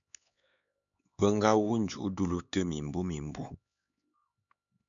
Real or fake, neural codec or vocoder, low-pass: fake; codec, 16 kHz, 4 kbps, X-Codec, HuBERT features, trained on LibriSpeech; 7.2 kHz